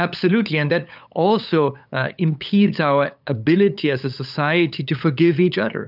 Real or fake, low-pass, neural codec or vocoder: fake; 5.4 kHz; codec, 16 kHz, 8 kbps, FunCodec, trained on LibriTTS, 25 frames a second